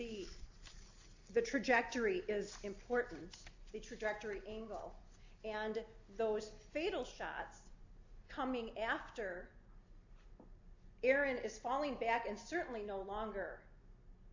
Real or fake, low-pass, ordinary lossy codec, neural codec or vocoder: real; 7.2 kHz; AAC, 48 kbps; none